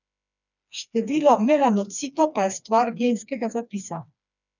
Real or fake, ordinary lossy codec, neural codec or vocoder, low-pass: fake; none; codec, 16 kHz, 2 kbps, FreqCodec, smaller model; 7.2 kHz